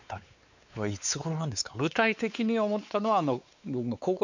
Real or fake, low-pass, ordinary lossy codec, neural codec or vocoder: fake; 7.2 kHz; none; codec, 16 kHz, 4 kbps, X-Codec, WavLM features, trained on Multilingual LibriSpeech